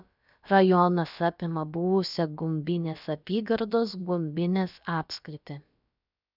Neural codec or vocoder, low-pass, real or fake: codec, 16 kHz, about 1 kbps, DyCAST, with the encoder's durations; 5.4 kHz; fake